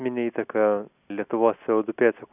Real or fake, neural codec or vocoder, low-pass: real; none; 3.6 kHz